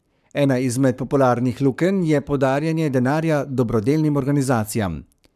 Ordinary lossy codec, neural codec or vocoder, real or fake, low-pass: none; codec, 44.1 kHz, 7.8 kbps, Pupu-Codec; fake; 14.4 kHz